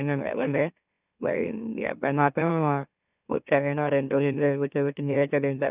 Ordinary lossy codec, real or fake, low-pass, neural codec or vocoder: none; fake; 3.6 kHz; autoencoder, 44.1 kHz, a latent of 192 numbers a frame, MeloTTS